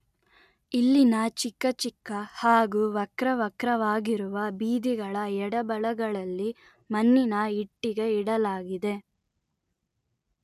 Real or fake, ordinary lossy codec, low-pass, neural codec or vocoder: real; none; 14.4 kHz; none